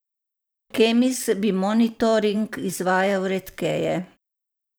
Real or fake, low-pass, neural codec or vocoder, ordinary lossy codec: fake; none; vocoder, 44.1 kHz, 128 mel bands every 512 samples, BigVGAN v2; none